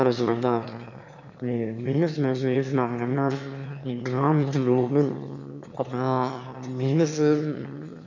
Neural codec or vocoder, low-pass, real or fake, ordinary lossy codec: autoencoder, 22.05 kHz, a latent of 192 numbers a frame, VITS, trained on one speaker; 7.2 kHz; fake; none